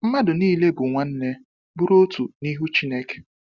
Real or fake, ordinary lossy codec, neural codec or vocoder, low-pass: real; Opus, 64 kbps; none; 7.2 kHz